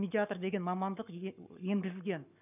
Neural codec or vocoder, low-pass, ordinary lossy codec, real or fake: codec, 44.1 kHz, 7.8 kbps, Pupu-Codec; 3.6 kHz; none; fake